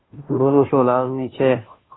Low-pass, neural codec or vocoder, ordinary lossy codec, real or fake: 7.2 kHz; codec, 16 kHz, 0.5 kbps, FunCodec, trained on Chinese and English, 25 frames a second; AAC, 16 kbps; fake